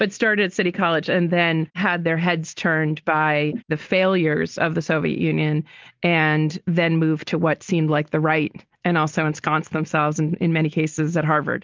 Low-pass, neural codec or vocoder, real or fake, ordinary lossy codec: 7.2 kHz; none; real; Opus, 24 kbps